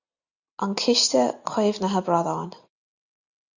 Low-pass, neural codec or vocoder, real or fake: 7.2 kHz; none; real